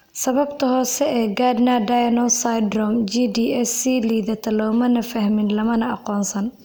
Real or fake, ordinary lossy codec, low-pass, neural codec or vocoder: real; none; none; none